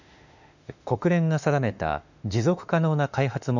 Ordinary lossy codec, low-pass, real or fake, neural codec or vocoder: none; 7.2 kHz; fake; autoencoder, 48 kHz, 32 numbers a frame, DAC-VAE, trained on Japanese speech